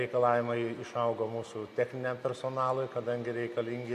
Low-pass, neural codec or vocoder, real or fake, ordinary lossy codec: 14.4 kHz; none; real; AAC, 96 kbps